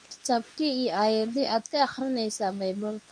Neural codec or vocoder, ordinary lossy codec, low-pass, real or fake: codec, 24 kHz, 0.9 kbps, WavTokenizer, medium speech release version 2; none; 9.9 kHz; fake